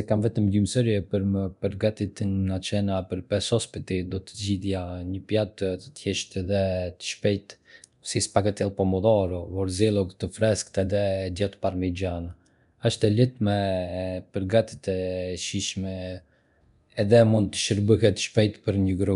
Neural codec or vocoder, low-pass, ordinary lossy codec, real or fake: codec, 24 kHz, 0.9 kbps, DualCodec; 10.8 kHz; none; fake